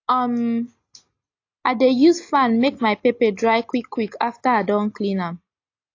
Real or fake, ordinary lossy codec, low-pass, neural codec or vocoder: real; AAC, 48 kbps; 7.2 kHz; none